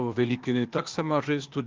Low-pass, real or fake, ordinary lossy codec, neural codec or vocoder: 7.2 kHz; fake; Opus, 32 kbps; codec, 16 kHz, 0.8 kbps, ZipCodec